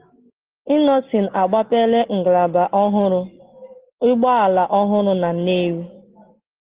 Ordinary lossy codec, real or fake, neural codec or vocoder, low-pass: Opus, 16 kbps; real; none; 3.6 kHz